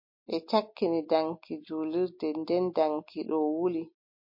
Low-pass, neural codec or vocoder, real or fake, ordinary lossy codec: 5.4 kHz; none; real; MP3, 32 kbps